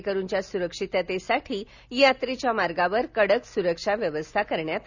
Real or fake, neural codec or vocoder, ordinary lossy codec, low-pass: real; none; none; 7.2 kHz